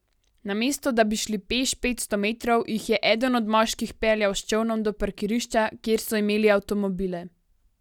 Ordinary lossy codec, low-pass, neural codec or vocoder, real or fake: none; 19.8 kHz; none; real